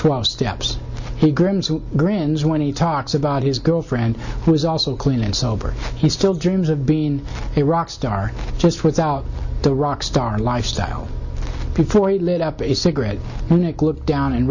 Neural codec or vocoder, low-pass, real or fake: none; 7.2 kHz; real